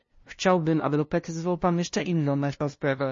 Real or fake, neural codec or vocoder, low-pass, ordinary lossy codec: fake; codec, 16 kHz, 0.5 kbps, FunCodec, trained on LibriTTS, 25 frames a second; 7.2 kHz; MP3, 32 kbps